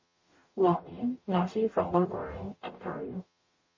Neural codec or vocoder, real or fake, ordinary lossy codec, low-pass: codec, 44.1 kHz, 0.9 kbps, DAC; fake; MP3, 32 kbps; 7.2 kHz